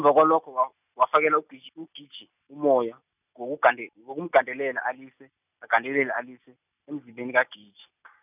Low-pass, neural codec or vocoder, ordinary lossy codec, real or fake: 3.6 kHz; none; none; real